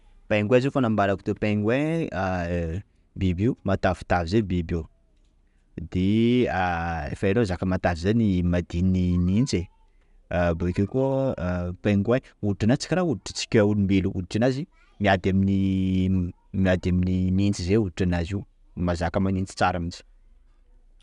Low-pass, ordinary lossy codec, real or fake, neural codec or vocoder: 10.8 kHz; MP3, 96 kbps; real; none